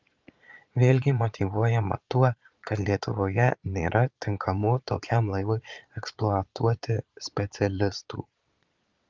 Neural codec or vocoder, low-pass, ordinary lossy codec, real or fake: vocoder, 22.05 kHz, 80 mel bands, Vocos; 7.2 kHz; Opus, 24 kbps; fake